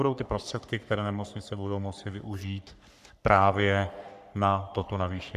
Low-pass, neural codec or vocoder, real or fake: 14.4 kHz; codec, 44.1 kHz, 3.4 kbps, Pupu-Codec; fake